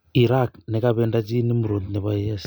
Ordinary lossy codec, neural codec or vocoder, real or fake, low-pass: none; none; real; none